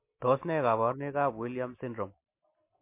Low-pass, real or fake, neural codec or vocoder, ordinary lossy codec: 3.6 kHz; real; none; MP3, 24 kbps